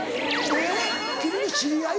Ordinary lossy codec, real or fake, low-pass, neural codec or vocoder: none; real; none; none